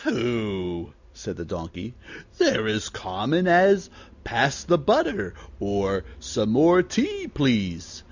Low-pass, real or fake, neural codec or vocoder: 7.2 kHz; real; none